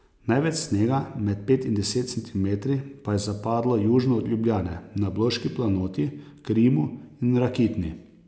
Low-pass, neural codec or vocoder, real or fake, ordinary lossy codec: none; none; real; none